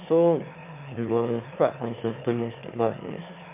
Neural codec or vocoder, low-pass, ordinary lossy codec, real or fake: autoencoder, 22.05 kHz, a latent of 192 numbers a frame, VITS, trained on one speaker; 3.6 kHz; MP3, 32 kbps; fake